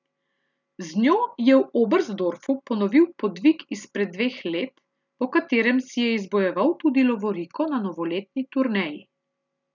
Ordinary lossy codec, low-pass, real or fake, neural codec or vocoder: none; 7.2 kHz; real; none